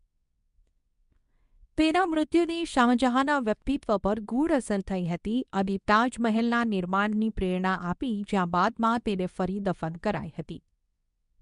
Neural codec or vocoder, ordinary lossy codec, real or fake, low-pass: codec, 24 kHz, 0.9 kbps, WavTokenizer, medium speech release version 2; none; fake; 10.8 kHz